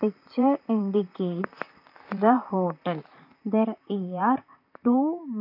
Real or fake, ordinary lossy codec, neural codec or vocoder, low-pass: fake; none; vocoder, 44.1 kHz, 128 mel bands, Pupu-Vocoder; 5.4 kHz